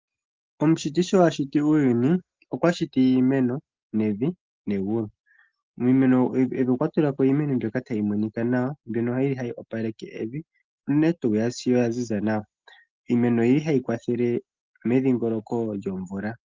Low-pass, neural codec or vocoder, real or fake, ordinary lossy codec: 7.2 kHz; none; real; Opus, 32 kbps